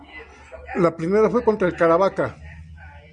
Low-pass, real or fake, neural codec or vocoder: 9.9 kHz; real; none